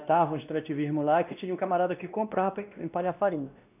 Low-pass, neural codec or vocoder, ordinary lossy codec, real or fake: 3.6 kHz; codec, 16 kHz, 1 kbps, X-Codec, WavLM features, trained on Multilingual LibriSpeech; none; fake